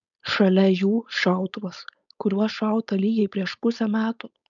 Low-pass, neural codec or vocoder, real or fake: 7.2 kHz; codec, 16 kHz, 4.8 kbps, FACodec; fake